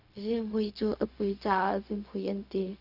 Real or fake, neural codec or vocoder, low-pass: fake; codec, 16 kHz, 0.4 kbps, LongCat-Audio-Codec; 5.4 kHz